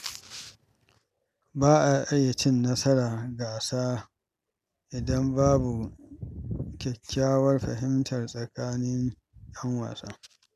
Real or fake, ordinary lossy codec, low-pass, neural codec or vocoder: real; none; 14.4 kHz; none